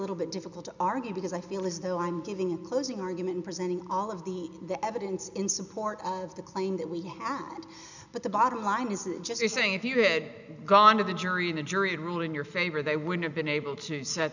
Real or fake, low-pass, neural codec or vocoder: real; 7.2 kHz; none